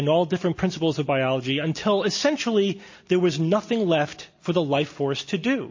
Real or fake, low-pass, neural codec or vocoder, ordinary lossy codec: real; 7.2 kHz; none; MP3, 32 kbps